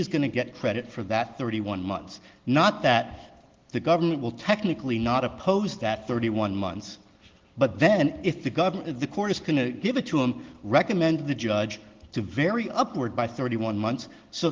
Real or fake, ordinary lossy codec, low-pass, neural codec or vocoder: real; Opus, 16 kbps; 7.2 kHz; none